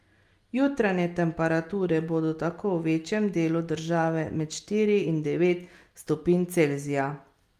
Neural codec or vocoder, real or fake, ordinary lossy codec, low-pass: none; real; Opus, 32 kbps; 14.4 kHz